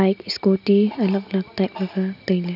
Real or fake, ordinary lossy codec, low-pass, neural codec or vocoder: real; none; 5.4 kHz; none